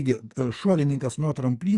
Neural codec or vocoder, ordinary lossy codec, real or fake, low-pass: codec, 44.1 kHz, 2.6 kbps, SNAC; Opus, 64 kbps; fake; 10.8 kHz